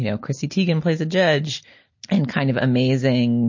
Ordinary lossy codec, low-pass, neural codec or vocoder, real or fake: MP3, 32 kbps; 7.2 kHz; none; real